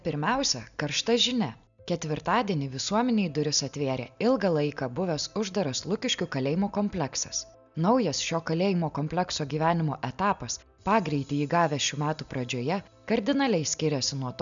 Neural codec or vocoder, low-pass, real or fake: none; 7.2 kHz; real